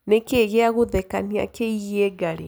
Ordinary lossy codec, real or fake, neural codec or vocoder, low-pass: none; real; none; none